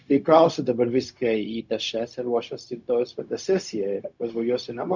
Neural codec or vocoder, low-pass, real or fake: codec, 16 kHz, 0.4 kbps, LongCat-Audio-Codec; 7.2 kHz; fake